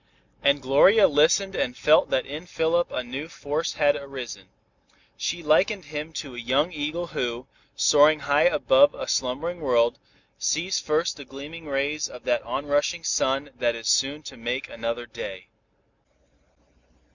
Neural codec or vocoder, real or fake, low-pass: none; real; 7.2 kHz